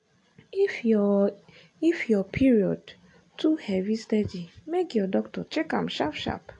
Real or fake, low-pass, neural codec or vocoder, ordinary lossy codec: real; 10.8 kHz; none; AAC, 48 kbps